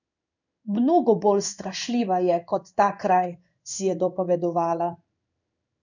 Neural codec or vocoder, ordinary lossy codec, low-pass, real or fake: codec, 16 kHz in and 24 kHz out, 1 kbps, XY-Tokenizer; none; 7.2 kHz; fake